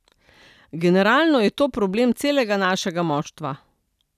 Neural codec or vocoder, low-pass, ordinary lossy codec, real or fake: none; 14.4 kHz; none; real